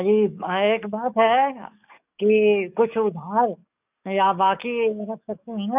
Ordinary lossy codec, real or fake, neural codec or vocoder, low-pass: none; fake; codec, 44.1 kHz, 7.8 kbps, DAC; 3.6 kHz